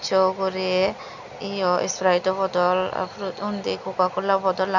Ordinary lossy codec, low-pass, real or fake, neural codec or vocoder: none; 7.2 kHz; real; none